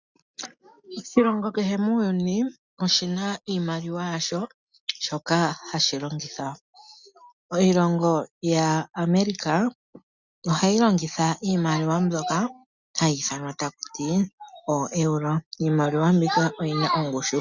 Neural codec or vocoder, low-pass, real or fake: none; 7.2 kHz; real